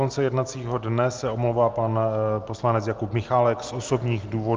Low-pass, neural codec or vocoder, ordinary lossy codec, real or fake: 7.2 kHz; none; Opus, 32 kbps; real